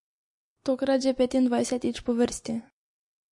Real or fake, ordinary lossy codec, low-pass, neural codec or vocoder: real; MP3, 48 kbps; 10.8 kHz; none